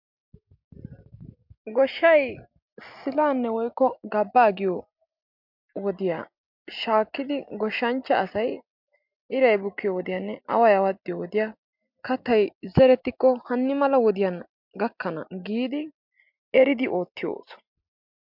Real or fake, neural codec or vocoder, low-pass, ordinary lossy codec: real; none; 5.4 kHz; MP3, 48 kbps